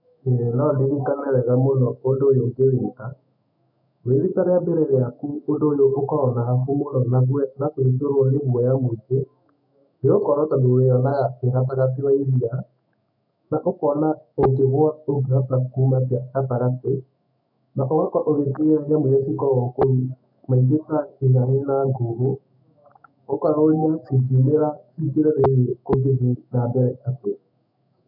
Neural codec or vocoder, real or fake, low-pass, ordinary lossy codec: autoencoder, 48 kHz, 128 numbers a frame, DAC-VAE, trained on Japanese speech; fake; 5.4 kHz; none